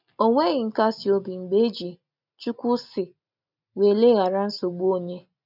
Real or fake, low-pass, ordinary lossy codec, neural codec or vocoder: real; 5.4 kHz; none; none